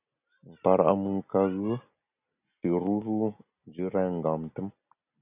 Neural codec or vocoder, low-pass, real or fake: none; 3.6 kHz; real